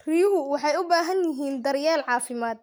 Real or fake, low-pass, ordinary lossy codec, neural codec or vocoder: real; none; none; none